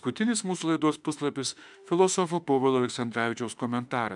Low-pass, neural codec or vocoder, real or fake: 10.8 kHz; autoencoder, 48 kHz, 32 numbers a frame, DAC-VAE, trained on Japanese speech; fake